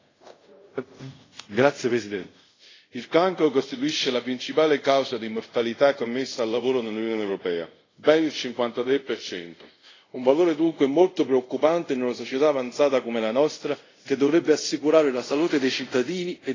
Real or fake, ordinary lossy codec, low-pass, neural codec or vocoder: fake; AAC, 32 kbps; 7.2 kHz; codec, 24 kHz, 0.5 kbps, DualCodec